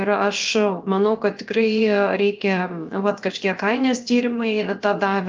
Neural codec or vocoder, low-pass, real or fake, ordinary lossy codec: codec, 16 kHz, 0.7 kbps, FocalCodec; 7.2 kHz; fake; Opus, 24 kbps